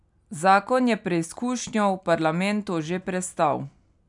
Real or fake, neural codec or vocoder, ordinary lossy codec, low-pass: real; none; none; 10.8 kHz